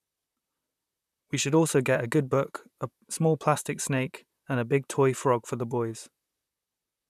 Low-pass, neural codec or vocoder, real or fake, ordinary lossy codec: 14.4 kHz; vocoder, 44.1 kHz, 128 mel bands, Pupu-Vocoder; fake; none